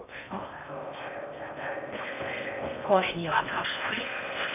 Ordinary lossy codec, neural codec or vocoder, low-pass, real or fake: none; codec, 16 kHz in and 24 kHz out, 0.6 kbps, FocalCodec, streaming, 2048 codes; 3.6 kHz; fake